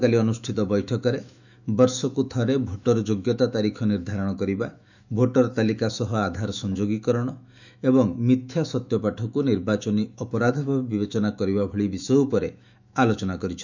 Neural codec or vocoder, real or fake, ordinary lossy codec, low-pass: autoencoder, 48 kHz, 128 numbers a frame, DAC-VAE, trained on Japanese speech; fake; none; 7.2 kHz